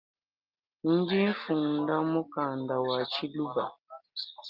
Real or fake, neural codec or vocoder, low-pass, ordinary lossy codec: real; none; 5.4 kHz; Opus, 32 kbps